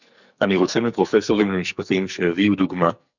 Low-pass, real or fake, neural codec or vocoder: 7.2 kHz; fake; codec, 44.1 kHz, 2.6 kbps, SNAC